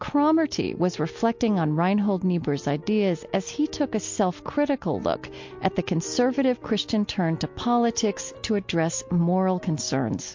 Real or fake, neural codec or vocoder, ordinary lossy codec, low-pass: real; none; MP3, 48 kbps; 7.2 kHz